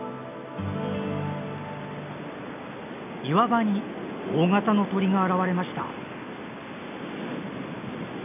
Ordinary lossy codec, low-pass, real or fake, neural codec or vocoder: none; 3.6 kHz; real; none